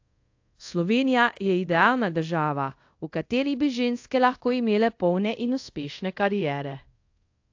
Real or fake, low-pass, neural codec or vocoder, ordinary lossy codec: fake; 7.2 kHz; codec, 24 kHz, 0.5 kbps, DualCodec; AAC, 48 kbps